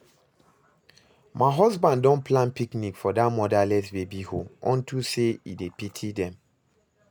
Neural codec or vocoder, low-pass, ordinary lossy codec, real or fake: none; none; none; real